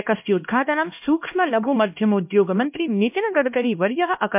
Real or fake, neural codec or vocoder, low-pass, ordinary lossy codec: fake; codec, 16 kHz, 1 kbps, X-Codec, HuBERT features, trained on LibriSpeech; 3.6 kHz; MP3, 32 kbps